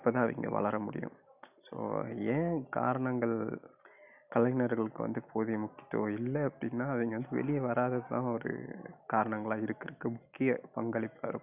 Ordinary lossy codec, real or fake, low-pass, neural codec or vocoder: none; real; 3.6 kHz; none